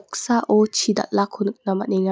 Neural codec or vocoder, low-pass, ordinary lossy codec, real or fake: none; none; none; real